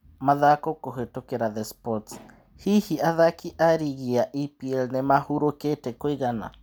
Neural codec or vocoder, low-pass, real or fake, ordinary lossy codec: none; none; real; none